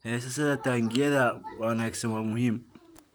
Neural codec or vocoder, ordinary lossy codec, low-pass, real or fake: vocoder, 44.1 kHz, 128 mel bands, Pupu-Vocoder; none; none; fake